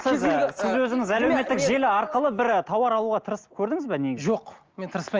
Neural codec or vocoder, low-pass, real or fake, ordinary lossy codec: none; 7.2 kHz; real; Opus, 24 kbps